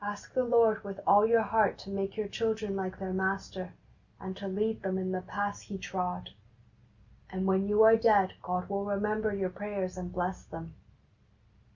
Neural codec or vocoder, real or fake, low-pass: none; real; 7.2 kHz